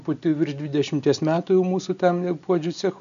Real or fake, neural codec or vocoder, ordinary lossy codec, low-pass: real; none; MP3, 96 kbps; 7.2 kHz